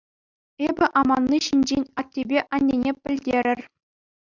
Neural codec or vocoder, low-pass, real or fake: none; 7.2 kHz; real